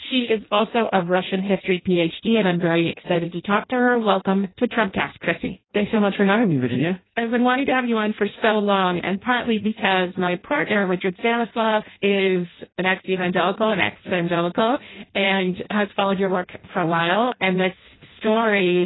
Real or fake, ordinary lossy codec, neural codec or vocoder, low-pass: fake; AAC, 16 kbps; codec, 16 kHz in and 24 kHz out, 0.6 kbps, FireRedTTS-2 codec; 7.2 kHz